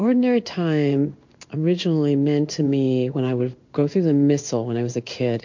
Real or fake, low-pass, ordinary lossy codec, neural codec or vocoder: fake; 7.2 kHz; MP3, 48 kbps; codec, 16 kHz in and 24 kHz out, 1 kbps, XY-Tokenizer